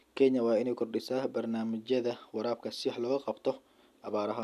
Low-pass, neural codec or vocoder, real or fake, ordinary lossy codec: 14.4 kHz; none; real; none